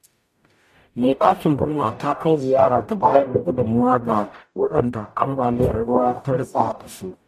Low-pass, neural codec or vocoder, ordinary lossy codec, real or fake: 14.4 kHz; codec, 44.1 kHz, 0.9 kbps, DAC; AAC, 96 kbps; fake